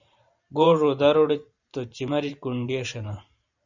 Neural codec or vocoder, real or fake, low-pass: vocoder, 44.1 kHz, 128 mel bands every 512 samples, BigVGAN v2; fake; 7.2 kHz